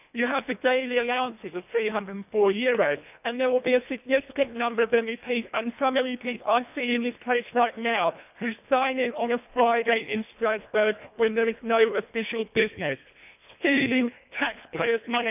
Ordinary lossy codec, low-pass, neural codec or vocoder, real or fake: none; 3.6 kHz; codec, 24 kHz, 1.5 kbps, HILCodec; fake